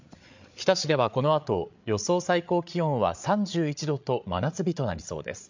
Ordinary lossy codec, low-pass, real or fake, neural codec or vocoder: MP3, 64 kbps; 7.2 kHz; fake; codec, 16 kHz, 8 kbps, FreqCodec, larger model